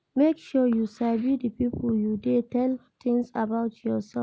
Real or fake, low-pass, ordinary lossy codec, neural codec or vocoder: real; none; none; none